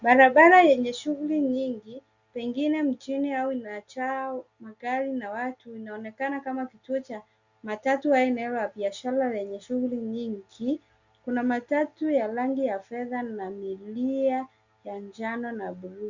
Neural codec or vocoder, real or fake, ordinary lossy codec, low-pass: none; real; Opus, 64 kbps; 7.2 kHz